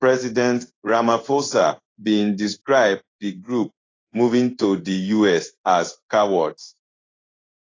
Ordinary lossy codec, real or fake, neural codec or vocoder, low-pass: AAC, 32 kbps; fake; codec, 16 kHz in and 24 kHz out, 1 kbps, XY-Tokenizer; 7.2 kHz